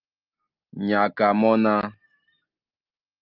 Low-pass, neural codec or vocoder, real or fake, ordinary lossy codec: 5.4 kHz; none; real; Opus, 32 kbps